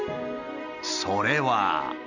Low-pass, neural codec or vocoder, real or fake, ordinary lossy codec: 7.2 kHz; none; real; none